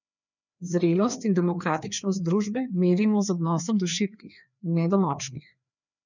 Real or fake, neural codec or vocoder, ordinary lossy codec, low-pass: fake; codec, 16 kHz, 2 kbps, FreqCodec, larger model; none; 7.2 kHz